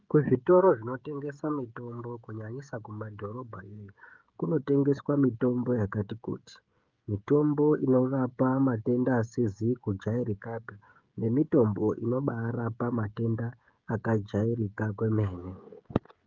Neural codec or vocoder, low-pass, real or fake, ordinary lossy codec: codec, 16 kHz, 16 kbps, FreqCodec, smaller model; 7.2 kHz; fake; Opus, 24 kbps